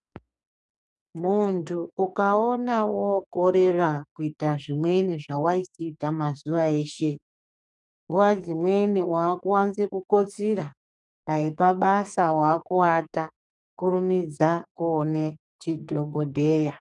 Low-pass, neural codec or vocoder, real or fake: 10.8 kHz; codec, 44.1 kHz, 2.6 kbps, SNAC; fake